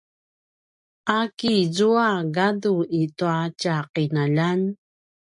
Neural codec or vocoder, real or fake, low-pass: none; real; 10.8 kHz